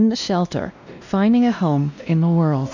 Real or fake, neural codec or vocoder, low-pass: fake; codec, 16 kHz, 1 kbps, X-Codec, WavLM features, trained on Multilingual LibriSpeech; 7.2 kHz